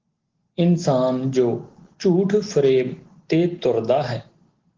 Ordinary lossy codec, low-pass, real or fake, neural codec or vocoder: Opus, 16 kbps; 7.2 kHz; real; none